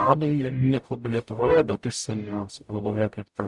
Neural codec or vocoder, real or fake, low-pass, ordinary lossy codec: codec, 44.1 kHz, 0.9 kbps, DAC; fake; 10.8 kHz; Opus, 64 kbps